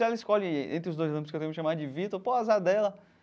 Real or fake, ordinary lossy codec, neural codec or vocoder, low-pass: real; none; none; none